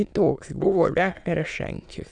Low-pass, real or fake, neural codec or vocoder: 9.9 kHz; fake; autoencoder, 22.05 kHz, a latent of 192 numbers a frame, VITS, trained on many speakers